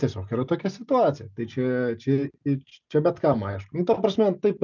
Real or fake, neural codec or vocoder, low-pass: real; none; 7.2 kHz